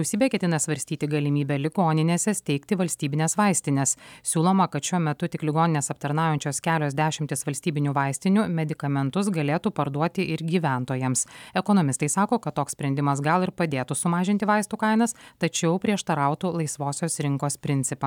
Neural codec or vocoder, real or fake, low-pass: none; real; 19.8 kHz